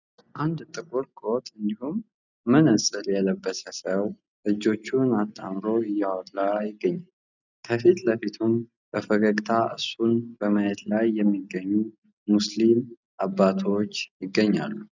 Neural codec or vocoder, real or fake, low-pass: none; real; 7.2 kHz